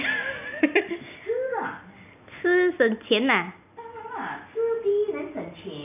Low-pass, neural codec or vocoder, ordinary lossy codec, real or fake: 3.6 kHz; none; none; real